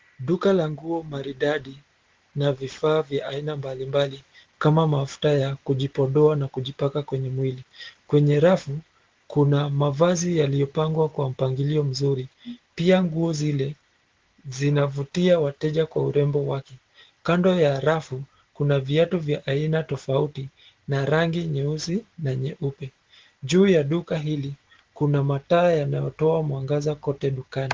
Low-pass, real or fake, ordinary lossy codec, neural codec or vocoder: 7.2 kHz; real; Opus, 16 kbps; none